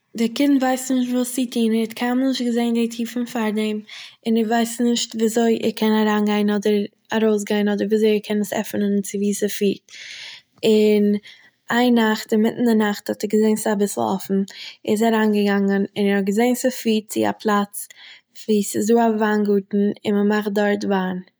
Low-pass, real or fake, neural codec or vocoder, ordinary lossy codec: none; real; none; none